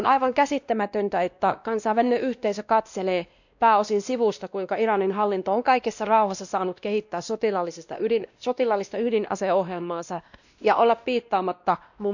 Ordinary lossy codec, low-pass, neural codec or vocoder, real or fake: none; 7.2 kHz; codec, 16 kHz, 1 kbps, X-Codec, WavLM features, trained on Multilingual LibriSpeech; fake